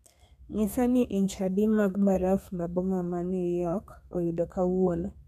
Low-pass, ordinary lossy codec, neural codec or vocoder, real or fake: 14.4 kHz; none; codec, 32 kHz, 1.9 kbps, SNAC; fake